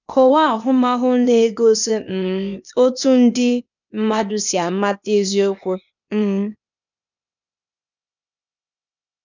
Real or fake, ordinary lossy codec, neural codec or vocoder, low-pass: fake; none; codec, 16 kHz, 0.8 kbps, ZipCodec; 7.2 kHz